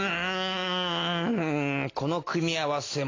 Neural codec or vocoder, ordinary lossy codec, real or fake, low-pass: none; AAC, 32 kbps; real; 7.2 kHz